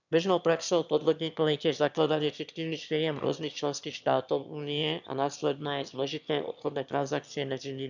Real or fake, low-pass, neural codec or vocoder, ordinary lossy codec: fake; 7.2 kHz; autoencoder, 22.05 kHz, a latent of 192 numbers a frame, VITS, trained on one speaker; none